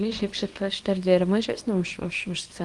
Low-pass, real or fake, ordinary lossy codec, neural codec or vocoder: 10.8 kHz; fake; Opus, 24 kbps; codec, 24 kHz, 0.9 kbps, WavTokenizer, small release